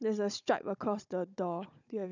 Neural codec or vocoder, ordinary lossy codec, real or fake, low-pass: codec, 16 kHz, 16 kbps, FunCodec, trained on Chinese and English, 50 frames a second; none; fake; 7.2 kHz